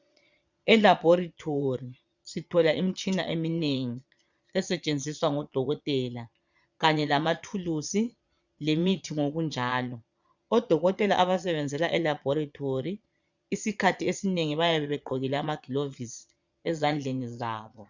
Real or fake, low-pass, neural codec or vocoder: fake; 7.2 kHz; vocoder, 22.05 kHz, 80 mel bands, Vocos